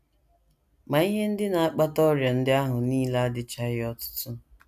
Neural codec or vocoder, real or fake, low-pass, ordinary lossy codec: none; real; 14.4 kHz; none